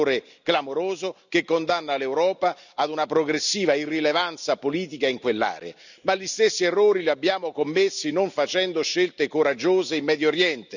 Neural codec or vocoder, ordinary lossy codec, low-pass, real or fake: none; none; 7.2 kHz; real